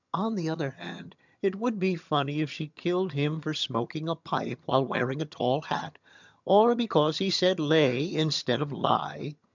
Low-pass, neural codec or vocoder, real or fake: 7.2 kHz; vocoder, 22.05 kHz, 80 mel bands, HiFi-GAN; fake